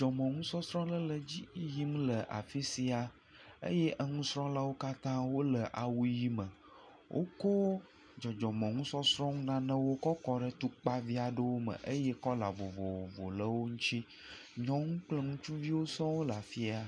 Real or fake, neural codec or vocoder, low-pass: real; none; 9.9 kHz